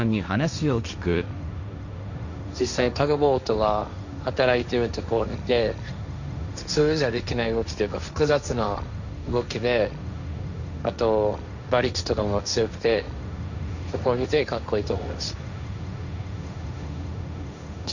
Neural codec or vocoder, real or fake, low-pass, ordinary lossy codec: codec, 16 kHz, 1.1 kbps, Voila-Tokenizer; fake; 7.2 kHz; none